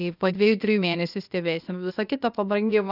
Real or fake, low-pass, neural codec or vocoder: fake; 5.4 kHz; codec, 16 kHz, 0.8 kbps, ZipCodec